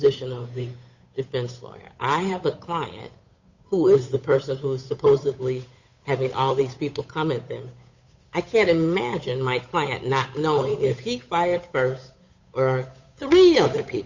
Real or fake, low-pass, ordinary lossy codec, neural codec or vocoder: fake; 7.2 kHz; Opus, 64 kbps; codec, 16 kHz, 8 kbps, FunCodec, trained on Chinese and English, 25 frames a second